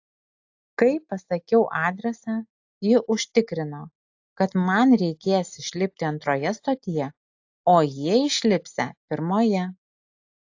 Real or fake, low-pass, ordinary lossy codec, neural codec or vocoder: real; 7.2 kHz; AAC, 48 kbps; none